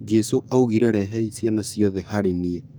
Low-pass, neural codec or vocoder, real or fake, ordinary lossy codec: none; codec, 44.1 kHz, 2.6 kbps, SNAC; fake; none